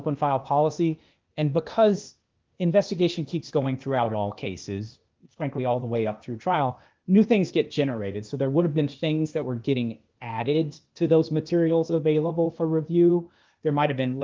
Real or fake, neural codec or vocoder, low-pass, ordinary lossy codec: fake; codec, 16 kHz, 0.8 kbps, ZipCodec; 7.2 kHz; Opus, 24 kbps